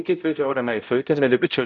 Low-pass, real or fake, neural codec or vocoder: 7.2 kHz; fake; codec, 16 kHz, 0.5 kbps, X-Codec, HuBERT features, trained on balanced general audio